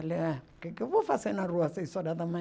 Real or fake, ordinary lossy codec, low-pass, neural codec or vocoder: real; none; none; none